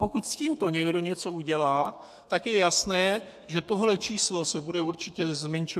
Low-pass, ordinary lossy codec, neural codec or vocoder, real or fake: 14.4 kHz; AAC, 96 kbps; codec, 32 kHz, 1.9 kbps, SNAC; fake